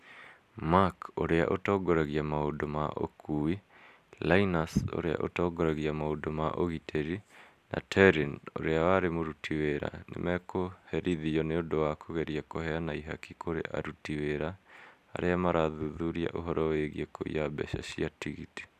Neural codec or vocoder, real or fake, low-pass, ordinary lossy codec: none; real; 14.4 kHz; none